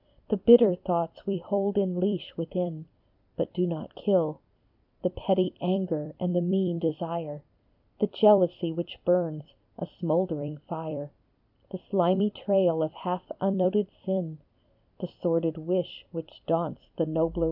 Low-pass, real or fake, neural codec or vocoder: 5.4 kHz; fake; vocoder, 44.1 kHz, 128 mel bands every 256 samples, BigVGAN v2